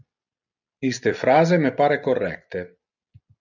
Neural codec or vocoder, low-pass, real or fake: none; 7.2 kHz; real